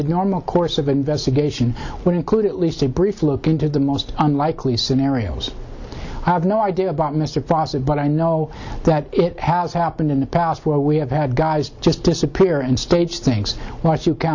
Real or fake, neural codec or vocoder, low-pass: real; none; 7.2 kHz